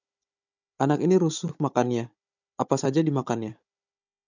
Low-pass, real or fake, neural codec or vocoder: 7.2 kHz; fake; codec, 16 kHz, 16 kbps, FunCodec, trained on Chinese and English, 50 frames a second